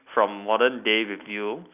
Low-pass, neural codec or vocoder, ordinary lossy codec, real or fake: 3.6 kHz; none; none; real